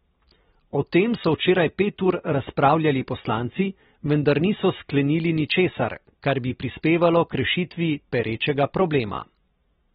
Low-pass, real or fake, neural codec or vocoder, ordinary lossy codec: 7.2 kHz; real; none; AAC, 16 kbps